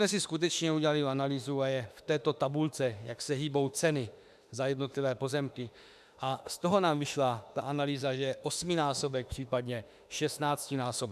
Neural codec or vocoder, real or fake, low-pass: autoencoder, 48 kHz, 32 numbers a frame, DAC-VAE, trained on Japanese speech; fake; 14.4 kHz